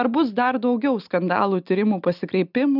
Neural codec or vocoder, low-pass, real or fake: none; 5.4 kHz; real